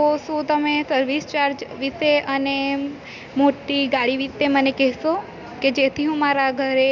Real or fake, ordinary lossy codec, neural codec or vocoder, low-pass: real; none; none; 7.2 kHz